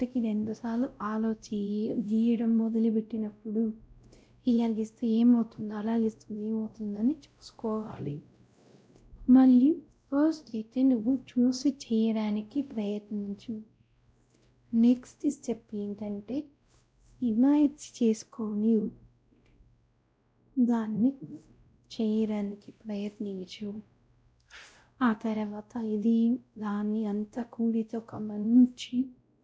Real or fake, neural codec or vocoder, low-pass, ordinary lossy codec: fake; codec, 16 kHz, 0.5 kbps, X-Codec, WavLM features, trained on Multilingual LibriSpeech; none; none